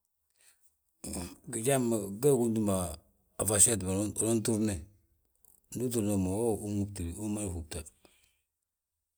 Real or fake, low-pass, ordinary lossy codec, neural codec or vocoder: real; none; none; none